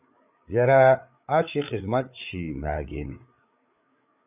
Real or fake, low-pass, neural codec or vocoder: fake; 3.6 kHz; codec, 16 kHz, 8 kbps, FreqCodec, larger model